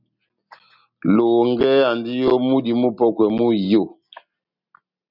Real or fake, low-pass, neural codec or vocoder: real; 5.4 kHz; none